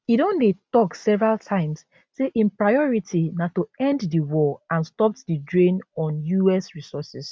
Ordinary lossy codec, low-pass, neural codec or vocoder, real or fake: none; none; none; real